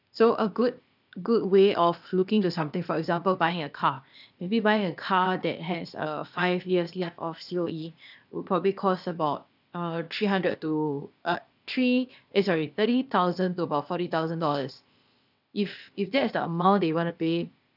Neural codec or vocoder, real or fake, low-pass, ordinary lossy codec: codec, 16 kHz, 0.8 kbps, ZipCodec; fake; 5.4 kHz; none